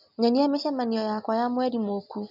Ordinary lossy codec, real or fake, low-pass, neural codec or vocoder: none; real; 5.4 kHz; none